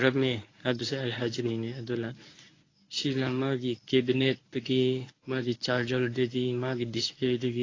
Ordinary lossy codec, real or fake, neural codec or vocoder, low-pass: AAC, 32 kbps; fake; codec, 24 kHz, 0.9 kbps, WavTokenizer, medium speech release version 1; 7.2 kHz